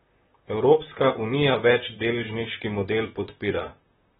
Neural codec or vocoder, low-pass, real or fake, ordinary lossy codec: vocoder, 44.1 kHz, 128 mel bands, Pupu-Vocoder; 19.8 kHz; fake; AAC, 16 kbps